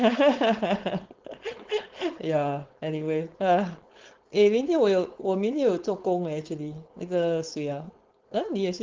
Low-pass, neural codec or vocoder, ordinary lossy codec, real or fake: 7.2 kHz; codec, 16 kHz, 4.8 kbps, FACodec; Opus, 16 kbps; fake